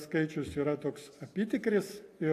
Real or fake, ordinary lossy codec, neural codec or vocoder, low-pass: real; AAC, 64 kbps; none; 14.4 kHz